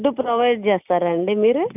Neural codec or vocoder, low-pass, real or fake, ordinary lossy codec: none; 3.6 kHz; real; none